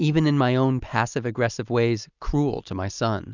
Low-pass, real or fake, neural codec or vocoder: 7.2 kHz; real; none